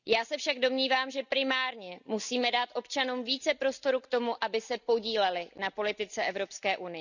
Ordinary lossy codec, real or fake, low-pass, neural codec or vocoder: none; real; 7.2 kHz; none